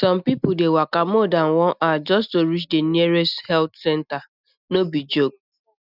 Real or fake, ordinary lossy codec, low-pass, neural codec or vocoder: real; none; 5.4 kHz; none